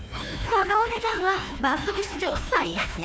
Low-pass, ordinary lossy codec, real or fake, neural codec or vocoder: none; none; fake; codec, 16 kHz, 1 kbps, FunCodec, trained on Chinese and English, 50 frames a second